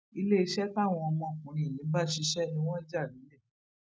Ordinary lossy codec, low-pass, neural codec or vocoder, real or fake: none; none; none; real